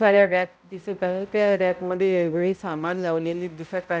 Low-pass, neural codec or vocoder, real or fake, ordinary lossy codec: none; codec, 16 kHz, 0.5 kbps, X-Codec, HuBERT features, trained on balanced general audio; fake; none